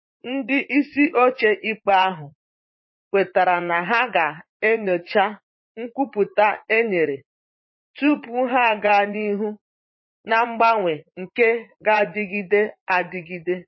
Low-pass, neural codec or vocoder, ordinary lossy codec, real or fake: 7.2 kHz; vocoder, 44.1 kHz, 80 mel bands, Vocos; MP3, 24 kbps; fake